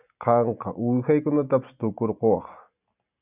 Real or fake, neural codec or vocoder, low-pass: real; none; 3.6 kHz